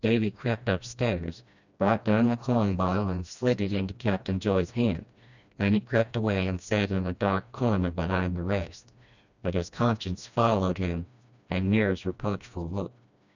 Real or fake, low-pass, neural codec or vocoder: fake; 7.2 kHz; codec, 16 kHz, 1 kbps, FreqCodec, smaller model